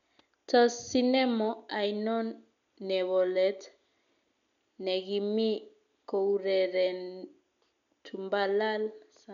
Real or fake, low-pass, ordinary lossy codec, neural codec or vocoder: real; 7.2 kHz; MP3, 96 kbps; none